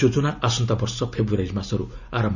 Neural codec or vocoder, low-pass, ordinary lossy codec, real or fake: none; 7.2 kHz; none; real